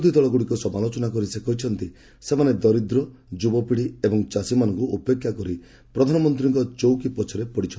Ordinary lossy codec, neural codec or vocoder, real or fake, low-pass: none; none; real; none